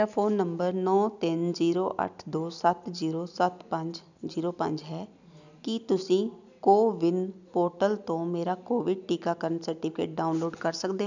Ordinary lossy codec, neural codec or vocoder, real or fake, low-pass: none; none; real; 7.2 kHz